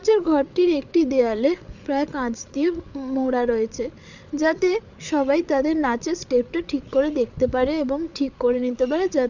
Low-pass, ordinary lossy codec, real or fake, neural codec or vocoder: 7.2 kHz; none; fake; codec, 16 kHz, 8 kbps, FreqCodec, larger model